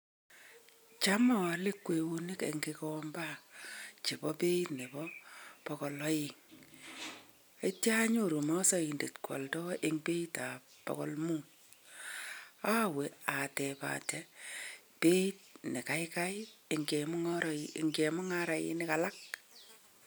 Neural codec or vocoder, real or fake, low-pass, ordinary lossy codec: none; real; none; none